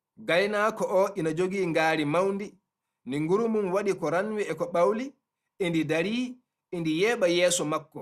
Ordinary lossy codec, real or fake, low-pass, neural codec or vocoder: Opus, 64 kbps; real; 14.4 kHz; none